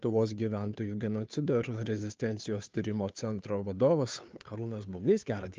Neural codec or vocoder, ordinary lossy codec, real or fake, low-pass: codec, 16 kHz, 2 kbps, FunCodec, trained on Chinese and English, 25 frames a second; Opus, 24 kbps; fake; 7.2 kHz